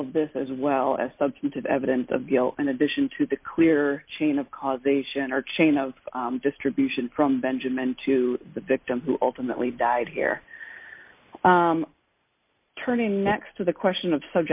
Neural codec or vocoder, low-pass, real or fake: none; 3.6 kHz; real